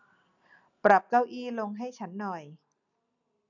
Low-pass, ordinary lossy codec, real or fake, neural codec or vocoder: 7.2 kHz; none; real; none